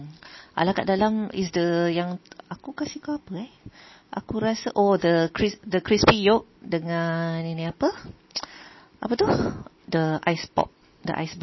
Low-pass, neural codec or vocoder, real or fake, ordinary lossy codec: 7.2 kHz; none; real; MP3, 24 kbps